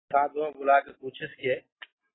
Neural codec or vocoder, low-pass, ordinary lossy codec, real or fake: none; 7.2 kHz; AAC, 16 kbps; real